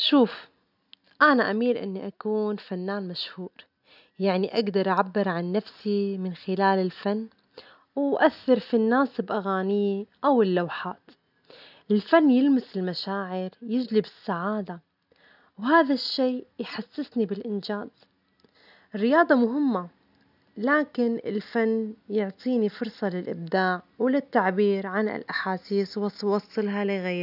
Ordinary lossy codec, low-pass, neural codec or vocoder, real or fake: none; 5.4 kHz; none; real